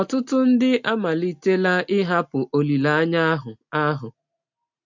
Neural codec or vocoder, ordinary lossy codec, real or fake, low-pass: none; MP3, 48 kbps; real; 7.2 kHz